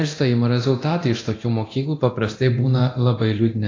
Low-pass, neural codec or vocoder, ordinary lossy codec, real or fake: 7.2 kHz; codec, 24 kHz, 0.9 kbps, DualCodec; AAC, 32 kbps; fake